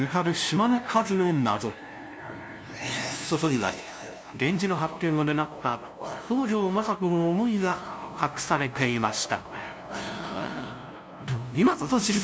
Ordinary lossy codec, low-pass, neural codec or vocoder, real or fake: none; none; codec, 16 kHz, 0.5 kbps, FunCodec, trained on LibriTTS, 25 frames a second; fake